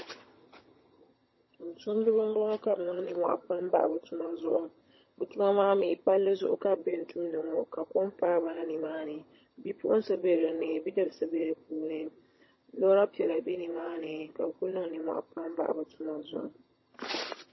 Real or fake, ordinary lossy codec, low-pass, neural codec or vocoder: fake; MP3, 24 kbps; 7.2 kHz; vocoder, 22.05 kHz, 80 mel bands, HiFi-GAN